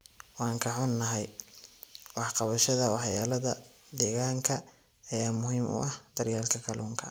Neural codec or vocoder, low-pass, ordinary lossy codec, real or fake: none; none; none; real